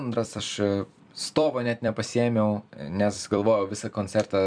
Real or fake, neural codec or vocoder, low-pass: fake; vocoder, 44.1 kHz, 128 mel bands every 256 samples, BigVGAN v2; 9.9 kHz